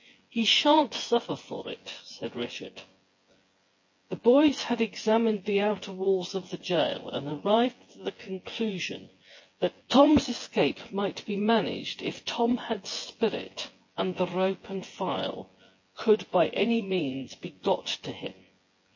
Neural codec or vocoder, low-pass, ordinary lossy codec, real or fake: vocoder, 24 kHz, 100 mel bands, Vocos; 7.2 kHz; MP3, 32 kbps; fake